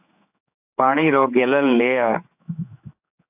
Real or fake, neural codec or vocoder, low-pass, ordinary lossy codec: fake; codec, 16 kHz, 4 kbps, X-Codec, HuBERT features, trained on general audio; 3.6 kHz; AAC, 32 kbps